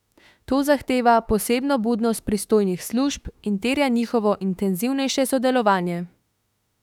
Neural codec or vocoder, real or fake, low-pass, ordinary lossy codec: autoencoder, 48 kHz, 32 numbers a frame, DAC-VAE, trained on Japanese speech; fake; 19.8 kHz; none